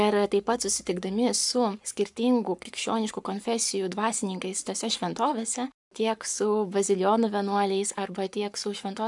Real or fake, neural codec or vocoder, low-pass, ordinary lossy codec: fake; codec, 44.1 kHz, 7.8 kbps, Pupu-Codec; 10.8 kHz; MP3, 96 kbps